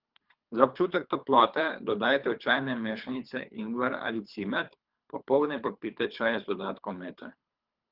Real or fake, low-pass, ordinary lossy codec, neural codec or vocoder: fake; 5.4 kHz; Opus, 24 kbps; codec, 24 kHz, 3 kbps, HILCodec